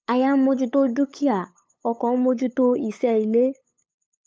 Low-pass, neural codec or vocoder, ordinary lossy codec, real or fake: none; codec, 16 kHz, 8 kbps, FunCodec, trained on LibriTTS, 25 frames a second; none; fake